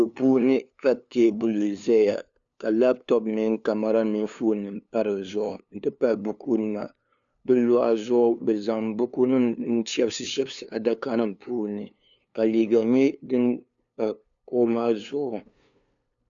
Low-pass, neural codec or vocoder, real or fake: 7.2 kHz; codec, 16 kHz, 2 kbps, FunCodec, trained on LibriTTS, 25 frames a second; fake